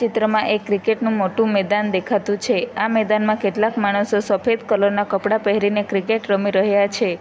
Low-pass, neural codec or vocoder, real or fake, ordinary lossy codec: none; none; real; none